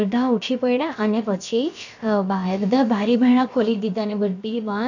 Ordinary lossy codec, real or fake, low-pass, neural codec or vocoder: none; fake; 7.2 kHz; codec, 16 kHz, about 1 kbps, DyCAST, with the encoder's durations